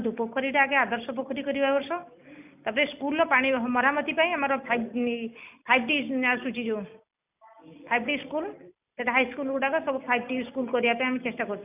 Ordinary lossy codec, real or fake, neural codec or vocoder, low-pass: none; real; none; 3.6 kHz